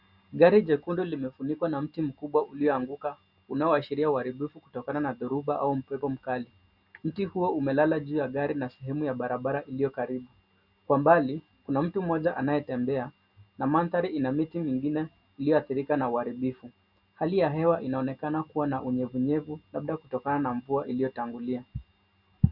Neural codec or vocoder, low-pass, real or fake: none; 5.4 kHz; real